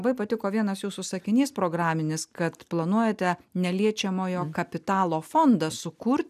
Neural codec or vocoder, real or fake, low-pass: none; real; 14.4 kHz